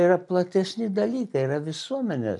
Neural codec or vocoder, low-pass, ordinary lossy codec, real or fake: none; 10.8 kHz; MP3, 64 kbps; real